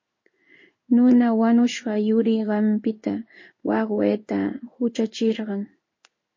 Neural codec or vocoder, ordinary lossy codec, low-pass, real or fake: codec, 16 kHz in and 24 kHz out, 1 kbps, XY-Tokenizer; MP3, 32 kbps; 7.2 kHz; fake